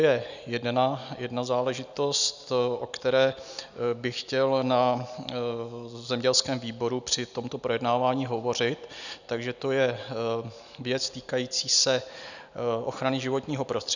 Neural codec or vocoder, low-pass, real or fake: none; 7.2 kHz; real